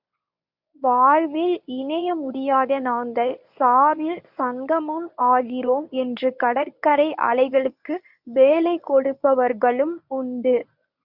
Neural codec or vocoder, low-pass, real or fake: codec, 24 kHz, 0.9 kbps, WavTokenizer, medium speech release version 1; 5.4 kHz; fake